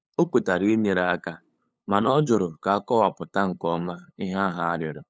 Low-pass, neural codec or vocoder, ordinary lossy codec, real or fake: none; codec, 16 kHz, 8 kbps, FunCodec, trained on LibriTTS, 25 frames a second; none; fake